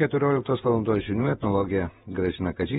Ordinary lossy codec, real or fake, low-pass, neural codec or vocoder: AAC, 16 kbps; fake; 19.8 kHz; vocoder, 48 kHz, 128 mel bands, Vocos